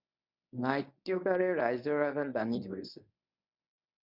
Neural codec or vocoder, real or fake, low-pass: codec, 24 kHz, 0.9 kbps, WavTokenizer, medium speech release version 1; fake; 5.4 kHz